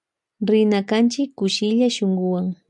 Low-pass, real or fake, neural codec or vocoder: 10.8 kHz; real; none